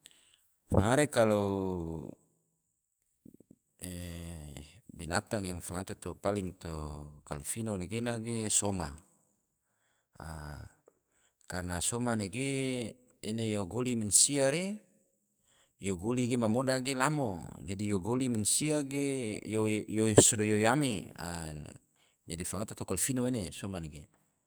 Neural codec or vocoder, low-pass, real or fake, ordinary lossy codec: codec, 44.1 kHz, 2.6 kbps, SNAC; none; fake; none